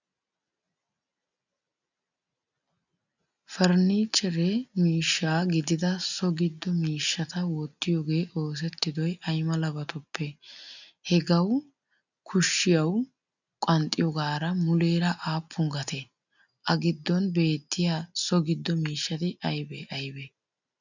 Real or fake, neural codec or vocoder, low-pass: real; none; 7.2 kHz